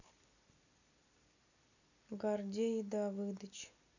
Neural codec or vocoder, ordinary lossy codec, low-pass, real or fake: none; none; 7.2 kHz; real